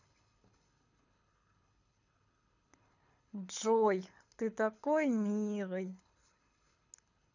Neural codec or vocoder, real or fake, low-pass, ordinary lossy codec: codec, 24 kHz, 6 kbps, HILCodec; fake; 7.2 kHz; none